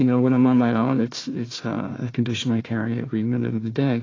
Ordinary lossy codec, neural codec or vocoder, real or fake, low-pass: AAC, 32 kbps; codec, 16 kHz, 1 kbps, FunCodec, trained on Chinese and English, 50 frames a second; fake; 7.2 kHz